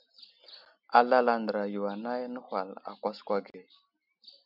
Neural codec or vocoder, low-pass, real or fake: none; 5.4 kHz; real